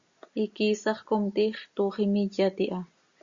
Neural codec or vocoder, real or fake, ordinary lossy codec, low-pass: none; real; Opus, 64 kbps; 7.2 kHz